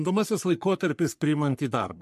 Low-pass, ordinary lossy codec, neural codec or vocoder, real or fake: 14.4 kHz; MP3, 64 kbps; codec, 44.1 kHz, 3.4 kbps, Pupu-Codec; fake